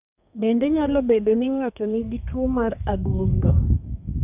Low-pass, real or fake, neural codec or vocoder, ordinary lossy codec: 3.6 kHz; fake; codec, 32 kHz, 1.9 kbps, SNAC; none